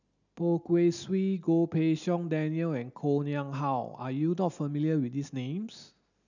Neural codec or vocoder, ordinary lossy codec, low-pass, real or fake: none; none; 7.2 kHz; real